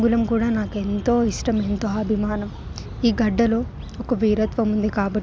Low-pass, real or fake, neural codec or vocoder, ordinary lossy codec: none; real; none; none